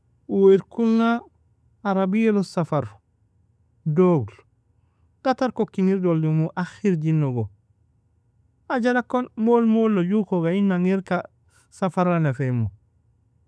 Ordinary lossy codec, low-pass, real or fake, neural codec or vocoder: none; none; real; none